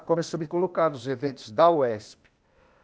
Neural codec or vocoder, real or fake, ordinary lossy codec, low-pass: codec, 16 kHz, 0.8 kbps, ZipCodec; fake; none; none